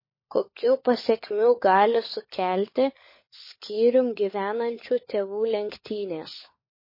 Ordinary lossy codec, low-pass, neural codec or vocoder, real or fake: MP3, 24 kbps; 5.4 kHz; codec, 16 kHz, 16 kbps, FunCodec, trained on LibriTTS, 50 frames a second; fake